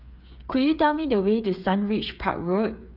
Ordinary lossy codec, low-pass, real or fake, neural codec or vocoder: none; 5.4 kHz; fake; codec, 16 kHz, 8 kbps, FreqCodec, smaller model